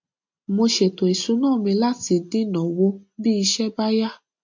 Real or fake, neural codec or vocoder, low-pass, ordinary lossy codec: real; none; 7.2 kHz; MP3, 48 kbps